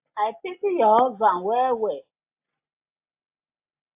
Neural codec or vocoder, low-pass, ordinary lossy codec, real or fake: none; 3.6 kHz; AAC, 32 kbps; real